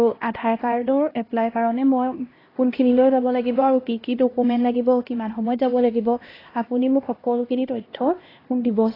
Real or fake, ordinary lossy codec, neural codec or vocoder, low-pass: fake; AAC, 24 kbps; codec, 16 kHz, 1 kbps, X-Codec, HuBERT features, trained on LibriSpeech; 5.4 kHz